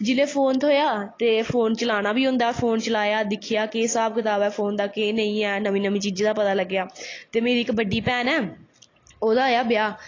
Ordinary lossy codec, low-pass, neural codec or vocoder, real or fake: AAC, 32 kbps; 7.2 kHz; none; real